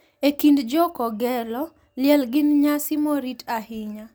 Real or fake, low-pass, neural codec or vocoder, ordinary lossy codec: real; none; none; none